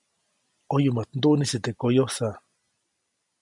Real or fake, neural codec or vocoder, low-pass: real; none; 10.8 kHz